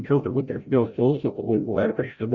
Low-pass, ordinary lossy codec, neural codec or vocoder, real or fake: 7.2 kHz; Opus, 64 kbps; codec, 16 kHz, 0.5 kbps, FreqCodec, larger model; fake